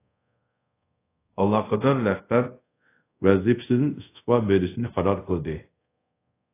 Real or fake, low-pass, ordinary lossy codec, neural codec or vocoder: fake; 3.6 kHz; AAC, 24 kbps; codec, 24 kHz, 0.5 kbps, DualCodec